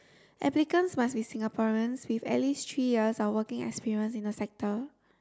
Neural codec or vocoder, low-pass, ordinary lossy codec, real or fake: none; none; none; real